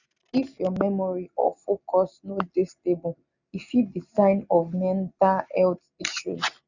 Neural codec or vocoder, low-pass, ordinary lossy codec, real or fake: none; 7.2 kHz; none; real